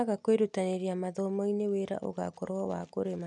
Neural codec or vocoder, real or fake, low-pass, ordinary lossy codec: none; real; 10.8 kHz; none